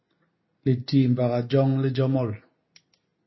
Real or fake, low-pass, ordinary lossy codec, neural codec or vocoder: real; 7.2 kHz; MP3, 24 kbps; none